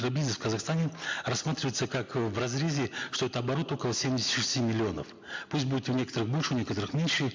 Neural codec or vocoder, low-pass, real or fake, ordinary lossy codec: none; 7.2 kHz; real; MP3, 64 kbps